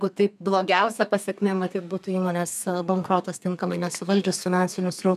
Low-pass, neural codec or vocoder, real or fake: 14.4 kHz; codec, 32 kHz, 1.9 kbps, SNAC; fake